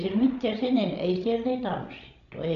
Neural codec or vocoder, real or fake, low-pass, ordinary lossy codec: codec, 16 kHz, 16 kbps, FreqCodec, larger model; fake; 7.2 kHz; MP3, 96 kbps